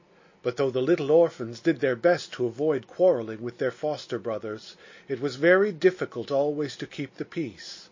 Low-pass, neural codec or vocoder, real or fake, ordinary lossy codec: 7.2 kHz; none; real; MP3, 32 kbps